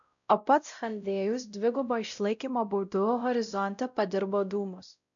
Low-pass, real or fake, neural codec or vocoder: 7.2 kHz; fake; codec, 16 kHz, 0.5 kbps, X-Codec, WavLM features, trained on Multilingual LibriSpeech